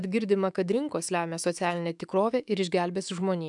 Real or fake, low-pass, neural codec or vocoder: fake; 10.8 kHz; autoencoder, 48 kHz, 128 numbers a frame, DAC-VAE, trained on Japanese speech